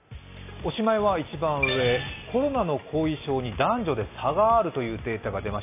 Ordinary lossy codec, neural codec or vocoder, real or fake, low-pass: AAC, 24 kbps; none; real; 3.6 kHz